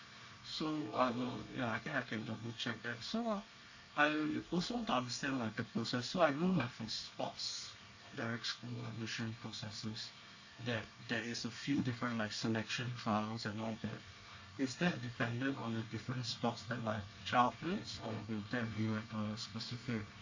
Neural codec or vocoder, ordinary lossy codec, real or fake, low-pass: codec, 24 kHz, 1 kbps, SNAC; AAC, 48 kbps; fake; 7.2 kHz